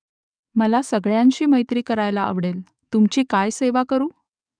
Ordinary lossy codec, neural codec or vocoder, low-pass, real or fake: none; codec, 44.1 kHz, 7.8 kbps, DAC; 9.9 kHz; fake